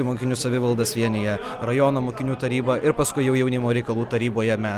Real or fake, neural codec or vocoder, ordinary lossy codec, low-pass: real; none; Opus, 32 kbps; 14.4 kHz